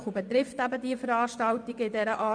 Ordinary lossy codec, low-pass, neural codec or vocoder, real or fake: none; 9.9 kHz; vocoder, 24 kHz, 100 mel bands, Vocos; fake